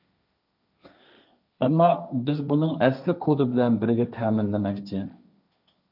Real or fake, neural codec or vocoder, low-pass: fake; codec, 16 kHz, 1.1 kbps, Voila-Tokenizer; 5.4 kHz